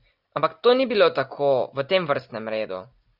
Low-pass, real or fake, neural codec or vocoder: 5.4 kHz; real; none